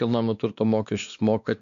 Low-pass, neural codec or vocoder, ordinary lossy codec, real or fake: 7.2 kHz; codec, 16 kHz, 2 kbps, X-Codec, WavLM features, trained on Multilingual LibriSpeech; MP3, 64 kbps; fake